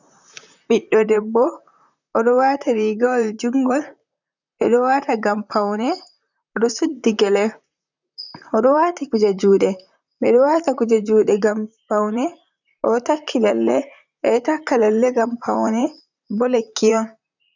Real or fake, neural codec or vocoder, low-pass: fake; vocoder, 44.1 kHz, 128 mel bands, Pupu-Vocoder; 7.2 kHz